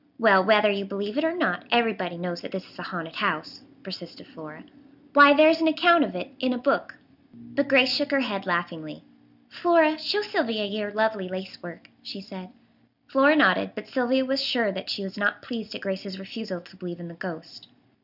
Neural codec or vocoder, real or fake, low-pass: none; real; 5.4 kHz